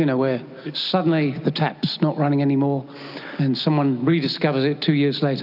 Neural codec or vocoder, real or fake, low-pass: none; real; 5.4 kHz